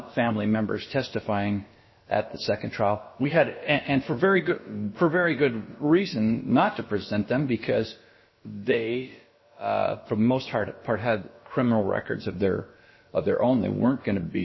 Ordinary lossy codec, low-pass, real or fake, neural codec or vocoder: MP3, 24 kbps; 7.2 kHz; fake; codec, 16 kHz, about 1 kbps, DyCAST, with the encoder's durations